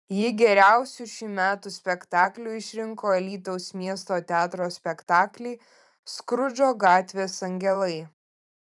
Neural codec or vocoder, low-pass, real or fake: vocoder, 44.1 kHz, 128 mel bands every 512 samples, BigVGAN v2; 10.8 kHz; fake